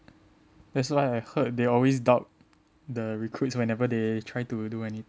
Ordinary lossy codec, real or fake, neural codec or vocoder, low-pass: none; real; none; none